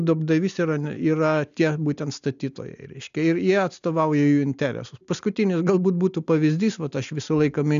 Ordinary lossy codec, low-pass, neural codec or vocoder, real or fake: AAC, 96 kbps; 7.2 kHz; none; real